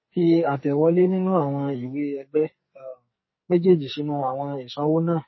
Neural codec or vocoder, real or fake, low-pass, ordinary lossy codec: codec, 44.1 kHz, 2.6 kbps, SNAC; fake; 7.2 kHz; MP3, 24 kbps